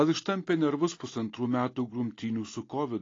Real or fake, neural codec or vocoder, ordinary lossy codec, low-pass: real; none; AAC, 32 kbps; 7.2 kHz